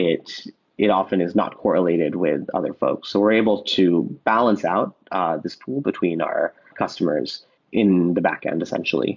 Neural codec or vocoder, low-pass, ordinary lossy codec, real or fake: none; 7.2 kHz; MP3, 64 kbps; real